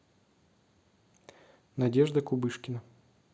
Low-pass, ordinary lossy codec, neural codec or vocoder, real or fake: none; none; none; real